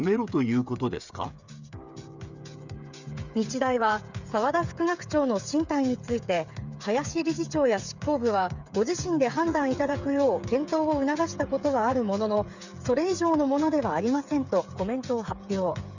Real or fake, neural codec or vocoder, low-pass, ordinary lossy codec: fake; codec, 16 kHz, 8 kbps, FreqCodec, smaller model; 7.2 kHz; none